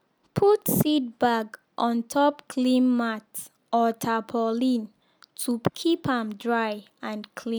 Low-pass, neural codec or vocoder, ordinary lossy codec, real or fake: none; none; none; real